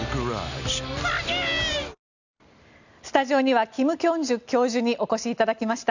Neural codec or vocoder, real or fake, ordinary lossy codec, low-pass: none; real; none; 7.2 kHz